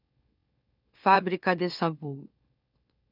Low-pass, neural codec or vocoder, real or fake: 5.4 kHz; autoencoder, 44.1 kHz, a latent of 192 numbers a frame, MeloTTS; fake